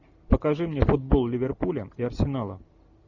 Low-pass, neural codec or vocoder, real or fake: 7.2 kHz; none; real